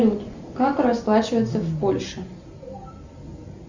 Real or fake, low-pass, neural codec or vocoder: real; 7.2 kHz; none